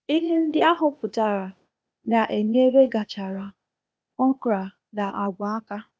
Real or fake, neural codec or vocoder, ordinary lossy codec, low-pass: fake; codec, 16 kHz, 0.8 kbps, ZipCodec; none; none